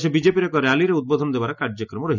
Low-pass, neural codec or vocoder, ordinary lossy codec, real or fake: 7.2 kHz; none; none; real